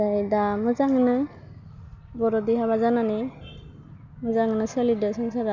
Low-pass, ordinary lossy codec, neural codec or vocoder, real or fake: 7.2 kHz; none; none; real